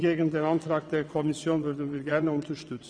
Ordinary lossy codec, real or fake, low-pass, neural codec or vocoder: none; fake; 9.9 kHz; vocoder, 22.05 kHz, 80 mel bands, WaveNeXt